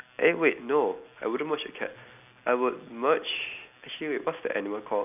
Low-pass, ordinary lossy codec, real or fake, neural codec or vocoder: 3.6 kHz; none; real; none